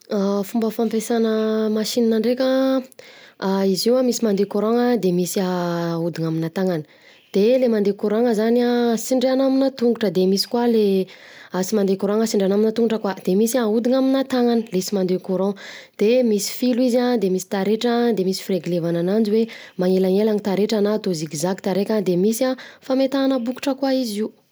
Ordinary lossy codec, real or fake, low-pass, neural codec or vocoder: none; real; none; none